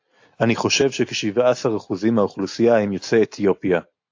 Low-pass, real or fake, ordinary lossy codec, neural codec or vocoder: 7.2 kHz; real; AAC, 48 kbps; none